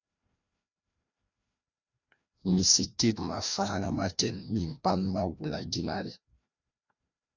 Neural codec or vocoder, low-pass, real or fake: codec, 16 kHz, 1 kbps, FreqCodec, larger model; 7.2 kHz; fake